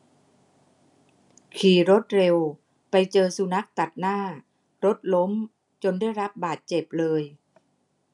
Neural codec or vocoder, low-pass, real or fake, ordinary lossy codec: none; 10.8 kHz; real; none